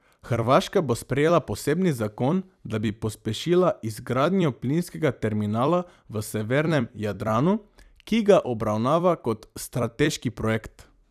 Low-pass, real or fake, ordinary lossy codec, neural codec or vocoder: 14.4 kHz; fake; none; vocoder, 44.1 kHz, 128 mel bands every 256 samples, BigVGAN v2